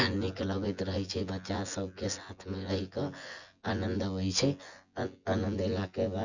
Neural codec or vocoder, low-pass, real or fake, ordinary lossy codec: vocoder, 24 kHz, 100 mel bands, Vocos; 7.2 kHz; fake; Opus, 64 kbps